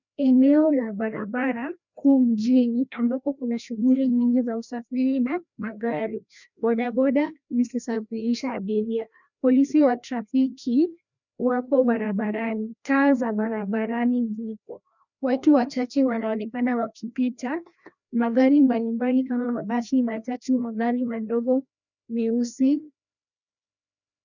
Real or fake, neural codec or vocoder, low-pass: fake; codec, 16 kHz, 1 kbps, FreqCodec, larger model; 7.2 kHz